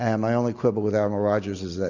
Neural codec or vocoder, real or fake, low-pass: none; real; 7.2 kHz